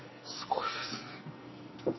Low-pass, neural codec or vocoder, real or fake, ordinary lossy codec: 7.2 kHz; codec, 44.1 kHz, 2.6 kbps, SNAC; fake; MP3, 24 kbps